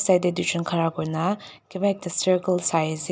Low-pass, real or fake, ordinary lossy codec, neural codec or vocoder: none; real; none; none